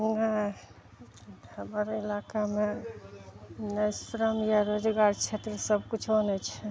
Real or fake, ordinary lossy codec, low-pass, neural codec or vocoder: real; none; none; none